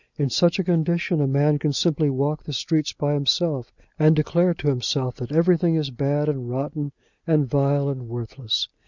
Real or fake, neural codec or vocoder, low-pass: real; none; 7.2 kHz